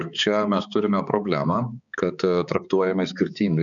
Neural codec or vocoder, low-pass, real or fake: codec, 16 kHz, 4 kbps, X-Codec, HuBERT features, trained on balanced general audio; 7.2 kHz; fake